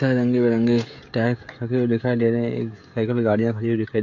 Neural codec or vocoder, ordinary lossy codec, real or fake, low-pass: codec, 16 kHz, 16 kbps, FreqCodec, smaller model; AAC, 48 kbps; fake; 7.2 kHz